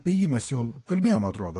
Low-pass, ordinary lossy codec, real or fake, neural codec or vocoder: 10.8 kHz; AAC, 48 kbps; fake; codec, 24 kHz, 1 kbps, SNAC